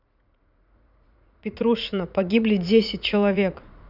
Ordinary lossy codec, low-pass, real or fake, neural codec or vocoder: none; 5.4 kHz; fake; vocoder, 44.1 kHz, 80 mel bands, Vocos